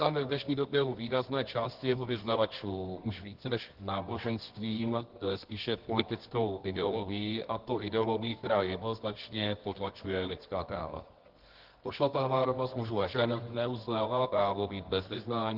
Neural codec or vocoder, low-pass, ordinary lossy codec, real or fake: codec, 24 kHz, 0.9 kbps, WavTokenizer, medium music audio release; 5.4 kHz; Opus, 16 kbps; fake